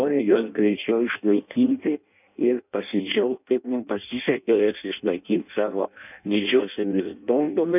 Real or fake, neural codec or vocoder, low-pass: fake; codec, 16 kHz in and 24 kHz out, 0.6 kbps, FireRedTTS-2 codec; 3.6 kHz